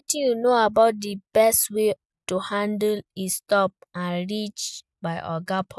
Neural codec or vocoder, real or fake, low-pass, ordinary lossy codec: none; real; none; none